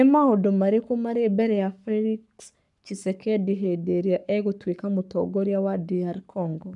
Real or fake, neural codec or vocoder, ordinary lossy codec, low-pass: fake; codec, 44.1 kHz, 7.8 kbps, Pupu-Codec; none; 10.8 kHz